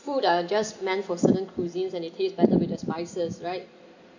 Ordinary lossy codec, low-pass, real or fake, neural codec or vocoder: none; 7.2 kHz; real; none